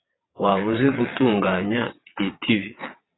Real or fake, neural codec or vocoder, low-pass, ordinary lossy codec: fake; vocoder, 22.05 kHz, 80 mel bands, WaveNeXt; 7.2 kHz; AAC, 16 kbps